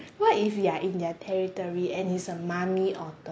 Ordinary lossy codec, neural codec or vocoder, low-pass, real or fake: none; none; none; real